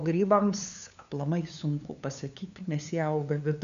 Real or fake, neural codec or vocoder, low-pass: fake; codec, 16 kHz, 2 kbps, FunCodec, trained on LibriTTS, 25 frames a second; 7.2 kHz